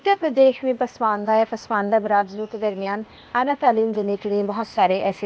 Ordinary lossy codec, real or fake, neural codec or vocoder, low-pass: none; fake; codec, 16 kHz, 0.8 kbps, ZipCodec; none